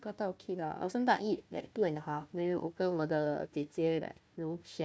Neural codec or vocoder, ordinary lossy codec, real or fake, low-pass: codec, 16 kHz, 1 kbps, FunCodec, trained on Chinese and English, 50 frames a second; none; fake; none